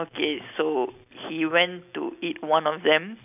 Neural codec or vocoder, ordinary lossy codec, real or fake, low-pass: none; none; real; 3.6 kHz